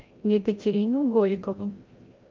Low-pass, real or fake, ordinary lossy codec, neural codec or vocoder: 7.2 kHz; fake; Opus, 32 kbps; codec, 16 kHz, 0.5 kbps, FreqCodec, larger model